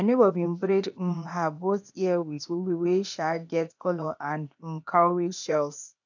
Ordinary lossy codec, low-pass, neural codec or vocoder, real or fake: none; 7.2 kHz; codec, 16 kHz, 0.8 kbps, ZipCodec; fake